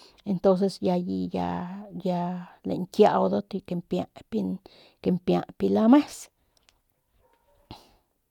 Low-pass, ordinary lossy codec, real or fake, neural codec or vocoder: 19.8 kHz; none; real; none